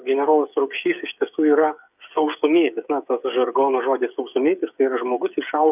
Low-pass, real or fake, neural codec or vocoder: 3.6 kHz; fake; codec, 16 kHz, 8 kbps, FreqCodec, smaller model